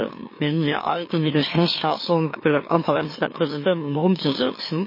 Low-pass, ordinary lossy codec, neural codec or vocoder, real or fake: 5.4 kHz; MP3, 24 kbps; autoencoder, 44.1 kHz, a latent of 192 numbers a frame, MeloTTS; fake